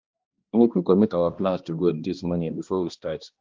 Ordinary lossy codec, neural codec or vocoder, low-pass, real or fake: Opus, 32 kbps; codec, 16 kHz, 1 kbps, X-Codec, HuBERT features, trained on balanced general audio; 7.2 kHz; fake